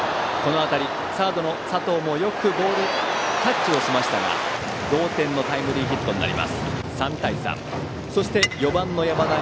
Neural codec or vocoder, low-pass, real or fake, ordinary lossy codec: none; none; real; none